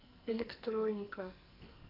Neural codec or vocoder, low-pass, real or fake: codec, 44.1 kHz, 2.6 kbps, SNAC; 5.4 kHz; fake